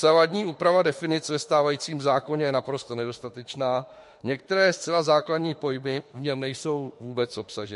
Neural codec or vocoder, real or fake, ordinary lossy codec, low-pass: autoencoder, 48 kHz, 32 numbers a frame, DAC-VAE, trained on Japanese speech; fake; MP3, 48 kbps; 14.4 kHz